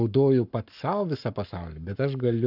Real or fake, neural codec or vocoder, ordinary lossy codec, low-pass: fake; codec, 44.1 kHz, 7.8 kbps, Pupu-Codec; AAC, 48 kbps; 5.4 kHz